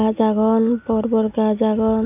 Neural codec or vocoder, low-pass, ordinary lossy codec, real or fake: none; 3.6 kHz; none; real